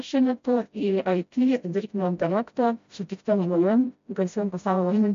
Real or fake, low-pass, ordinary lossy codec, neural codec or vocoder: fake; 7.2 kHz; MP3, 48 kbps; codec, 16 kHz, 0.5 kbps, FreqCodec, smaller model